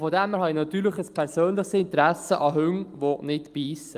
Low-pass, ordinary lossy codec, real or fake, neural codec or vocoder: 14.4 kHz; Opus, 32 kbps; real; none